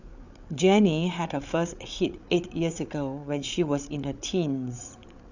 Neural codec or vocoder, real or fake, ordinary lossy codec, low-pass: codec, 16 kHz, 8 kbps, FreqCodec, larger model; fake; none; 7.2 kHz